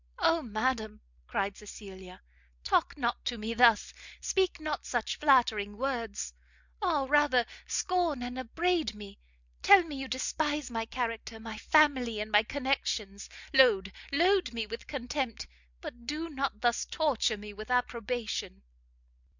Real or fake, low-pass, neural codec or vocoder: real; 7.2 kHz; none